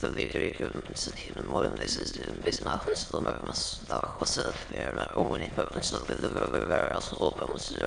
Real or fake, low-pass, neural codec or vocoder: fake; 9.9 kHz; autoencoder, 22.05 kHz, a latent of 192 numbers a frame, VITS, trained on many speakers